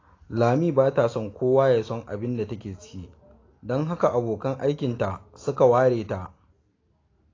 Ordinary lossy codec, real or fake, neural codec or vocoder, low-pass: AAC, 32 kbps; real; none; 7.2 kHz